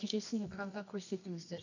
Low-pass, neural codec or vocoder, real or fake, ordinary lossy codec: 7.2 kHz; codec, 24 kHz, 0.9 kbps, WavTokenizer, medium music audio release; fake; none